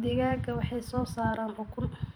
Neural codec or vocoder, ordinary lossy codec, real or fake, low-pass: vocoder, 44.1 kHz, 128 mel bands every 512 samples, BigVGAN v2; none; fake; none